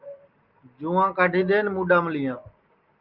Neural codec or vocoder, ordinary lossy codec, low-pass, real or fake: none; Opus, 32 kbps; 5.4 kHz; real